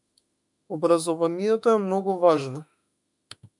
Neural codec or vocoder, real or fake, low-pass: autoencoder, 48 kHz, 32 numbers a frame, DAC-VAE, trained on Japanese speech; fake; 10.8 kHz